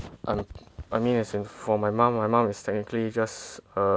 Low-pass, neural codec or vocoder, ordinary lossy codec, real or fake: none; none; none; real